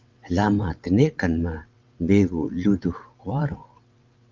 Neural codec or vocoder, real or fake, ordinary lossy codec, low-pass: vocoder, 22.05 kHz, 80 mel bands, WaveNeXt; fake; Opus, 24 kbps; 7.2 kHz